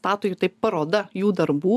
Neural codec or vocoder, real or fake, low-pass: none; real; 14.4 kHz